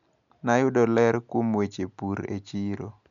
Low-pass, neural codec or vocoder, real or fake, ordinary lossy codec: 7.2 kHz; none; real; none